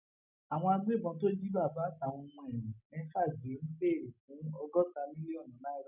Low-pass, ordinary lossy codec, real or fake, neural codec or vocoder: 3.6 kHz; none; real; none